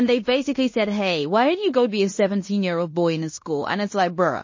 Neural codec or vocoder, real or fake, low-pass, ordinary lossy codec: codec, 16 kHz in and 24 kHz out, 0.9 kbps, LongCat-Audio-Codec, fine tuned four codebook decoder; fake; 7.2 kHz; MP3, 32 kbps